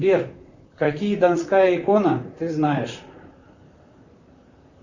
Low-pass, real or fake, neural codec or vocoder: 7.2 kHz; fake; vocoder, 44.1 kHz, 128 mel bands, Pupu-Vocoder